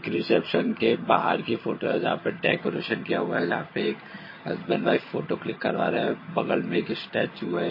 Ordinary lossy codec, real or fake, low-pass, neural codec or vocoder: MP3, 24 kbps; fake; 5.4 kHz; vocoder, 22.05 kHz, 80 mel bands, HiFi-GAN